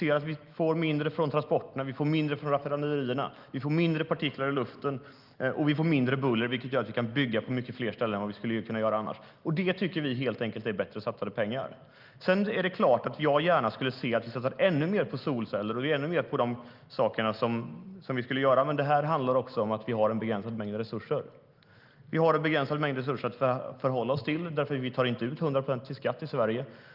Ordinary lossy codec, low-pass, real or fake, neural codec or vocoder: Opus, 32 kbps; 5.4 kHz; real; none